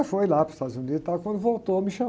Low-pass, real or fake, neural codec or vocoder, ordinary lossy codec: none; real; none; none